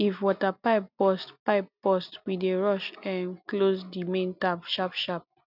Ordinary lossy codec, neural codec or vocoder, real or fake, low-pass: none; none; real; 5.4 kHz